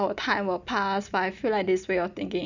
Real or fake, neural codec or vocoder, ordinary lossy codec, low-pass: real; none; none; 7.2 kHz